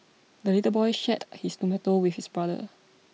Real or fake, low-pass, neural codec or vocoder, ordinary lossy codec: real; none; none; none